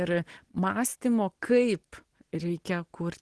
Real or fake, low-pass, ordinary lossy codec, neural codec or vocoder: real; 10.8 kHz; Opus, 16 kbps; none